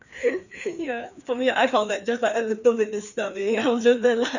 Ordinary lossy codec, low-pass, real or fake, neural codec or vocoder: none; 7.2 kHz; fake; codec, 16 kHz, 2 kbps, FreqCodec, larger model